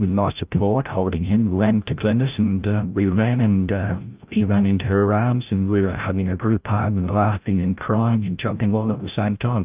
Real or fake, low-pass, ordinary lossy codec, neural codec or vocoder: fake; 3.6 kHz; Opus, 24 kbps; codec, 16 kHz, 0.5 kbps, FreqCodec, larger model